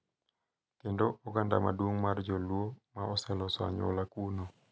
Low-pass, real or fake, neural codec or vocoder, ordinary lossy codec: none; real; none; none